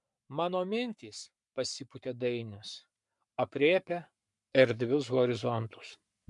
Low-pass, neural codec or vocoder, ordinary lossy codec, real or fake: 10.8 kHz; codec, 44.1 kHz, 7.8 kbps, Pupu-Codec; MP3, 64 kbps; fake